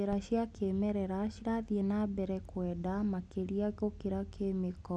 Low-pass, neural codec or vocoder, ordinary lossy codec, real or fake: none; none; none; real